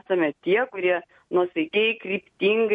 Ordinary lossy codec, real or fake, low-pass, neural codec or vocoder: MP3, 48 kbps; real; 10.8 kHz; none